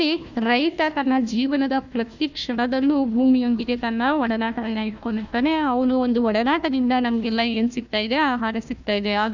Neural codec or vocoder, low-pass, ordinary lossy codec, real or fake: codec, 16 kHz, 1 kbps, FunCodec, trained on Chinese and English, 50 frames a second; 7.2 kHz; none; fake